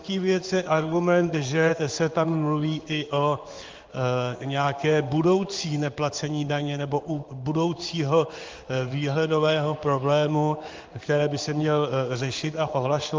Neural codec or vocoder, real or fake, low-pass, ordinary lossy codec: codec, 16 kHz in and 24 kHz out, 1 kbps, XY-Tokenizer; fake; 7.2 kHz; Opus, 32 kbps